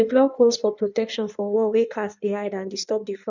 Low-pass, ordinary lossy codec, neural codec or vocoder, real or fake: 7.2 kHz; none; codec, 16 kHz in and 24 kHz out, 1.1 kbps, FireRedTTS-2 codec; fake